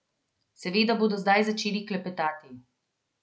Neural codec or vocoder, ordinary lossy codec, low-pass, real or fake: none; none; none; real